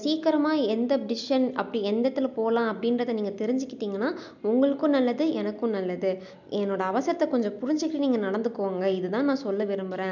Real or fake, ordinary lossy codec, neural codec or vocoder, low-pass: real; none; none; 7.2 kHz